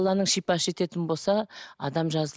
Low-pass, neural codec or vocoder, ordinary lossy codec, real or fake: none; none; none; real